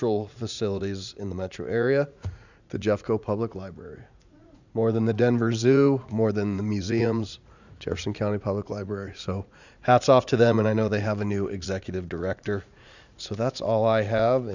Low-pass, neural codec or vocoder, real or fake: 7.2 kHz; vocoder, 44.1 kHz, 80 mel bands, Vocos; fake